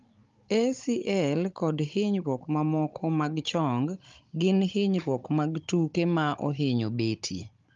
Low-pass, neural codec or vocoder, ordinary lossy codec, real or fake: 7.2 kHz; codec, 16 kHz, 16 kbps, FunCodec, trained on Chinese and English, 50 frames a second; Opus, 32 kbps; fake